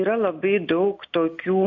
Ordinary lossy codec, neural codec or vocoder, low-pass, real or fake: MP3, 48 kbps; none; 7.2 kHz; real